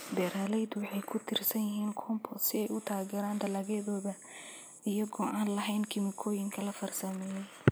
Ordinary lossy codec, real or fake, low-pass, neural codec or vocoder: none; real; none; none